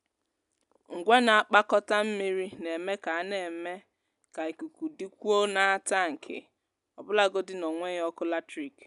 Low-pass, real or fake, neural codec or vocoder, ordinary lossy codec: 10.8 kHz; real; none; none